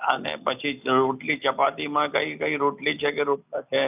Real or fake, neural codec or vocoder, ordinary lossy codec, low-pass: real; none; none; 3.6 kHz